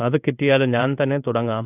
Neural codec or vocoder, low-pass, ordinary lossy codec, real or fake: codec, 16 kHz in and 24 kHz out, 1 kbps, XY-Tokenizer; 3.6 kHz; none; fake